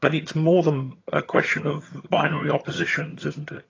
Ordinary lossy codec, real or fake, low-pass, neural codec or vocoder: AAC, 32 kbps; fake; 7.2 kHz; vocoder, 22.05 kHz, 80 mel bands, HiFi-GAN